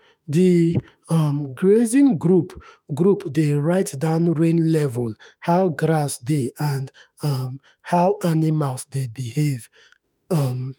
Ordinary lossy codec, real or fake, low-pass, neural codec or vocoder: none; fake; none; autoencoder, 48 kHz, 32 numbers a frame, DAC-VAE, trained on Japanese speech